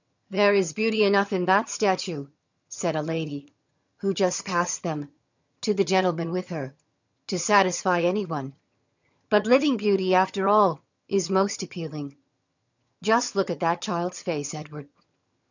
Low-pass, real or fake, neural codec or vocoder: 7.2 kHz; fake; vocoder, 22.05 kHz, 80 mel bands, HiFi-GAN